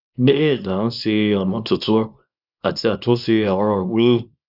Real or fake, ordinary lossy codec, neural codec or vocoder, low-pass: fake; none; codec, 24 kHz, 0.9 kbps, WavTokenizer, small release; 5.4 kHz